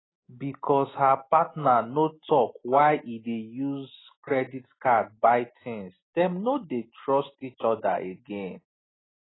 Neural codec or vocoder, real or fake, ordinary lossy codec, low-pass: none; real; AAC, 16 kbps; 7.2 kHz